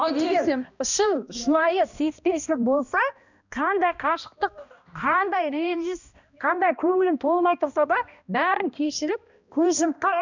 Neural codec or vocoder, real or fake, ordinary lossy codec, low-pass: codec, 16 kHz, 1 kbps, X-Codec, HuBERT features, trained on balanced general audio; fake; none; 7.2 kHz